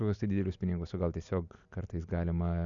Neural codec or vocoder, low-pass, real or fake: none; 7.2 kHz; real